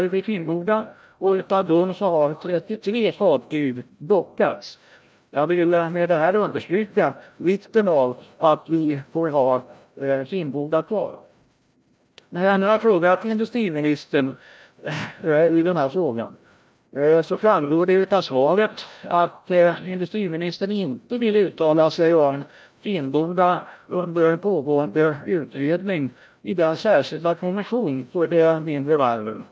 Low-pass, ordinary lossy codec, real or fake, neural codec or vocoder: none; none; fake; codec, 16 kHz, 0.5 kbps, FreqCodec, larger model